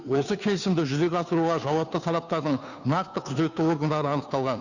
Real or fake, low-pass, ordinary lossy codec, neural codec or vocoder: fake; 7.2 kHz; none; codec, 16 kHz, 2 kbps, FunCodec, trained on Chinese and English, 25 frames a second